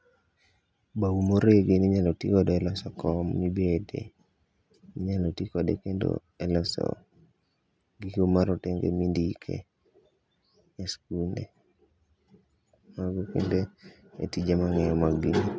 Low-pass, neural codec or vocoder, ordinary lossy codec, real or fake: none; none; none; real